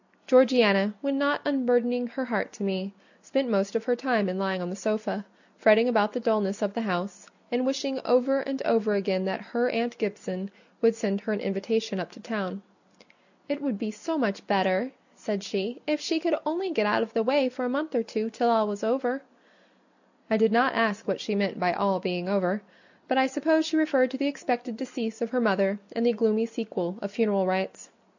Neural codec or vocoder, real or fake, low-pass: none; real; 7.2 kHz